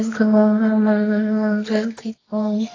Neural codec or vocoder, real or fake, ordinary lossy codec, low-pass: codec, 24 kHz, 0.9 kbps, WavTokenizer, medium music audio release; fake; AAC, 32 kbps; 7.2 kHz